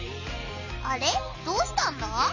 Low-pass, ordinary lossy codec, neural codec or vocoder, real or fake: 7.2 kHz; none; none; real